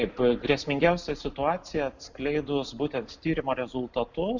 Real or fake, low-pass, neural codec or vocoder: real; 7.2 kHz; none